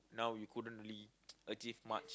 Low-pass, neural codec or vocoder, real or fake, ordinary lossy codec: none; none; real; none